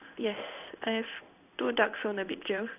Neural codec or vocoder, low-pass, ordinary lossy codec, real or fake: codec, 16 kHz in and 24 kHz out, 1 kbps, XY-Tokenizer; 3.6 kHz; none; fake